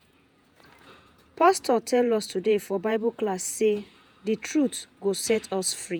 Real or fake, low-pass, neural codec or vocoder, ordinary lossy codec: fake; none; vocoder, 48 kHz, 128 mel bands, Vocos; none